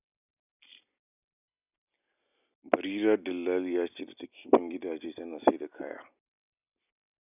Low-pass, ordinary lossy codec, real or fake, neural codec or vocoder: 3.6 kHz; none; real; none